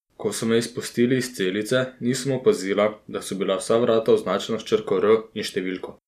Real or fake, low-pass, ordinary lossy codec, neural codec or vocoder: real; 14.4 kHz; none; none